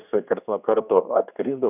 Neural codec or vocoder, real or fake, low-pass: codec, 16 kHz, 2 kbps, X-Codec, HuBERT features, trained on general audio; fake; 3.6 kHz